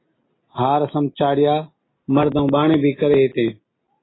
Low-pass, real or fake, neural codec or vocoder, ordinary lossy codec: 7.2 kHz; real; none; AAC, 16 kbps